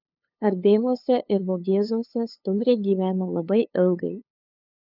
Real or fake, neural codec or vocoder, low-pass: fake; codec, 16 kHz, 2 kbps, FunCodec, trained on LibriTTS, 25 frames a second; 5.4 kHz